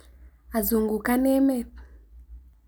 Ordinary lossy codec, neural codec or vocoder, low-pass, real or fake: none; none; none; real